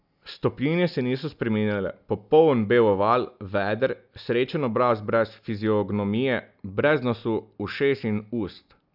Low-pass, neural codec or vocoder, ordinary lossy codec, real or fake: 5.4 kHz; none; none; real